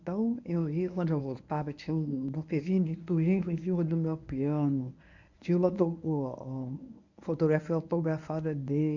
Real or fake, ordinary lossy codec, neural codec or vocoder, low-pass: fake; none; codec, 24 kHz, 0.9 kbps, WavTokenizer, medium speech release version 1; 7.2 kHz